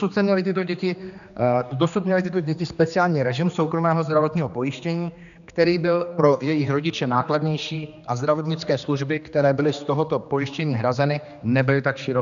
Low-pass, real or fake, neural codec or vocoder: 7.2 kHz; fake; codec, 16 kHz, 2 kbps, X-Codec, HuBERT features, trained on general audio